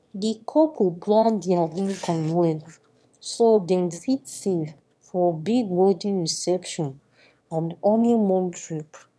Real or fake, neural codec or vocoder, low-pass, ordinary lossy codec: fake; autoencoder, 22.05 kHz, a latent of 192 numbers a frame, VITS, trained on one speaker; none; none